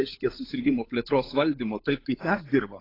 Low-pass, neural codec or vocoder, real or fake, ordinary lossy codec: 5.4 kHz; codec, 16 kHz, 16 kbps, FunCodec, trained on LibriTTS, 50 frames a second; fake; AAC, 24 kbps